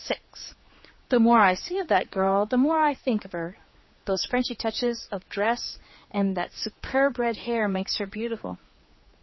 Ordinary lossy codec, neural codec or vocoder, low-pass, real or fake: MP3, 24 kbps; codec, 16 kHz, 4 kbps, X-Codec, HuBERT features, trained on general audio; 7.2 kHz; fake